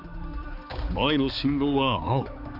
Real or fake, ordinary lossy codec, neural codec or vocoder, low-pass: fake; none; codec, 16 kHz, 4 kbps, X-Codec, HuBERT features, trained on balanced general audio; 5.4 kHz